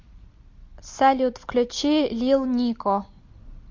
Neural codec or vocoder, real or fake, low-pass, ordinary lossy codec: none; real; 7.2 kHz; MP3, 48 kbps